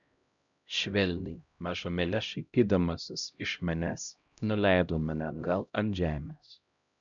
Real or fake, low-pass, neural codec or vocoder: fake; 7.2 kHz; codec, 16 kHz, 0.5 kbps, X-Codec, HuBERT features, trained on LibriSpeech